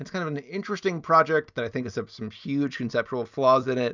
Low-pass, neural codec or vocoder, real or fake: 7.2 kHz; none; real